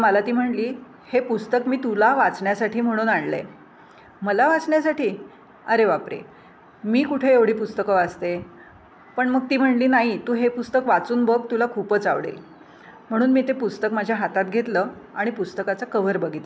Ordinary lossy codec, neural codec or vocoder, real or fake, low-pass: none; none; real; none